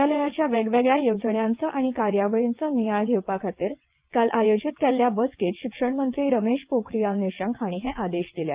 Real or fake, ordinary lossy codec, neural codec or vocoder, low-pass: fake; Opus, 24 kbps; vocoder, 22.05 kHz, 80 mel bands, WaveNeXt; 3.6 kHz